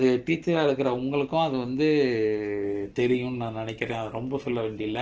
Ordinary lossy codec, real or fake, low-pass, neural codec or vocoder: Opus, 16 kbps; real; 7.2 kHz; none